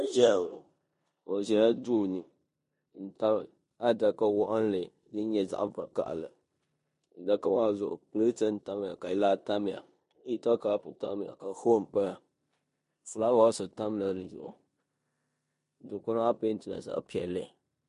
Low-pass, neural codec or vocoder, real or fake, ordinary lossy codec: 10.8 kHz; codec, 16 kHz in and 24 kHz out, 0.9 kbps, LongCat-Audio-Codec, four codebook decoder; fake; MP3, 48 kbps